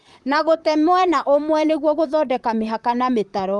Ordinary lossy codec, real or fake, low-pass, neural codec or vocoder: Opus, 32 kbps; fake; 10.8 kHz; vocoder, 44.1 kHz, 128 mel bands, Pupu-Vocoder